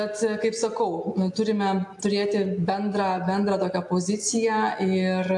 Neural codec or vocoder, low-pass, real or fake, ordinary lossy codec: none; 10.8 kHz; real; AAC, 64 kbps